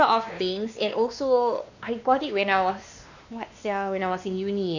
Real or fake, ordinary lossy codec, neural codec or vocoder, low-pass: fake; none; codec, 16 kHz, 2 kbps, X-Codec, WavLM features, trained on Multilingual LibriSpeech; 7.2 kHz